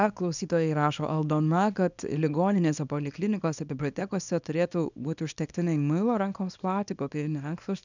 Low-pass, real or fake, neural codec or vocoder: 7.2 kHz; fake; codec, 24 kHz, 0.9 kbps, WavTokenizer, small release